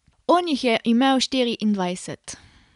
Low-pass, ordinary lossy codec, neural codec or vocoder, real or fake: 10.8 kHz; none; none; real